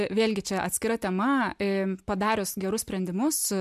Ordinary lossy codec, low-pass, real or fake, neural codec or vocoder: MP3, 96 kbps; 14.4 kHz; real; none